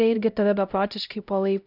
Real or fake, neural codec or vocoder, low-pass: fake; codec, 16 kHz, 0.5 kbps, X-Codec, HuBERT features, trained on LibriSpeech; 5.4 kHz